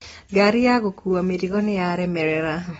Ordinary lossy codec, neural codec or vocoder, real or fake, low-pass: AAC, 24 kbps; none; real; 19.8 kHz